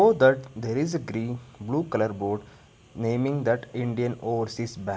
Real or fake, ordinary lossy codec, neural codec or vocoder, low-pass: real; none; none; none